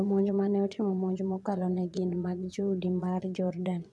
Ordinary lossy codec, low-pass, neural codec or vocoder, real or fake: none; none; vocoder, 22.05 kHz, 80 mel bands, Vocos; fake